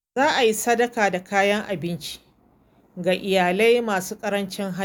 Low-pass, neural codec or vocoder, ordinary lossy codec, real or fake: none; none; none; real